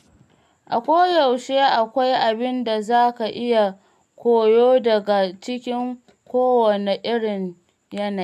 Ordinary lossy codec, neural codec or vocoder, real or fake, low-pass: none; none; real; 14.4 kHz